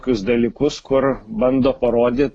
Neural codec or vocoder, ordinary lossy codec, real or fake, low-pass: none; AAC, 32 kbps; real; 9.9 kHz